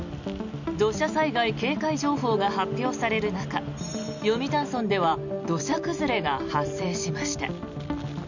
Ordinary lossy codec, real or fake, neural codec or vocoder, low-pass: none; real; none; 7.2 kHz